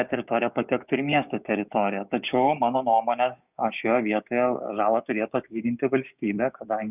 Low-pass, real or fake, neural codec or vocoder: 3.6 kHz; fake; codec, 16 kHz, 6 kbps, DAC